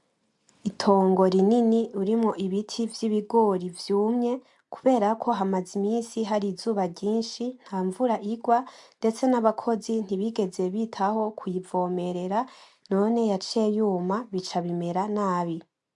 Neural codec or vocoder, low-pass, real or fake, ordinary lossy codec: none; 10.8 kHz; real; MP3, 64 kbps